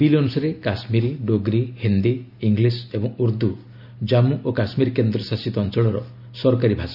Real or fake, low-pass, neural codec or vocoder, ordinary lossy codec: real; 5.4 kHz; none; none